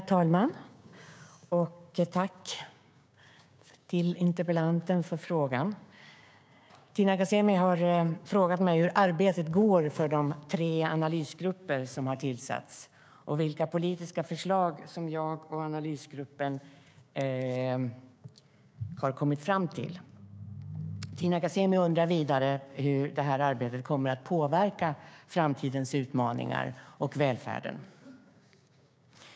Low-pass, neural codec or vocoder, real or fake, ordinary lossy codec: none; codec, 16 kHz, 6 kbps, DAC; fake; none